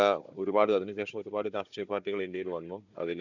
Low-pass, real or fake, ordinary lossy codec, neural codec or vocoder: 7.2 kHz; fake; none; codec, 16 kHz, 16 kbps, FunCodec, trained on Chinese and English, 50 frames a second